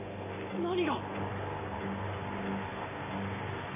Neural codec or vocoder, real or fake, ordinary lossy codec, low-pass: none; real; MP3, 16 kbps; 3.6 kHz